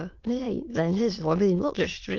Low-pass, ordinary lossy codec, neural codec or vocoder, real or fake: 7.2 kHz; Opus, 32 kbps; autoencoder, 22.05 kHz, a latent of 192 numbers a frame, VITS, trained on many speakers; fake